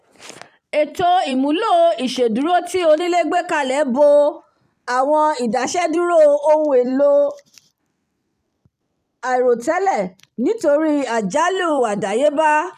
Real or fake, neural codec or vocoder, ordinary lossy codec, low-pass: fake; vocoder, 44.1 kHz, 128 mel bands every 256 samples, BigVGAN v2; none; 14.4 kHz